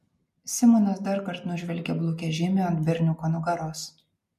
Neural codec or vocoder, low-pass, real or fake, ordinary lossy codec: none; 14.4 kHz; real; MP3, 64 kbps